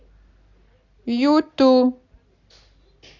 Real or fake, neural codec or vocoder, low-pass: real; none; 7.2 kHz